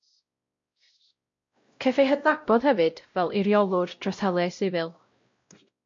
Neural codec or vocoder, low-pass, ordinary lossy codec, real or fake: codec, 16 kHz, 0.5 kbps, X-Codec, WavLM features, trained on Multilingual LibriSpeech; 7.2 kHz; MP3, 48 kbps; fake